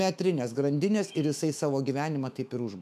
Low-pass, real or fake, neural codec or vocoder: 14.4 kHz; fake; autoencoder, 48 kHz, 128 numbers a frame, DAC-VAE, trained on Japanese speech